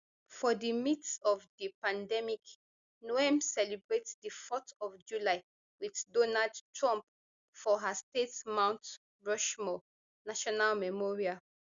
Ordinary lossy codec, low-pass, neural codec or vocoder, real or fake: Opus, 64 kbps; 7.2 kHz; none; real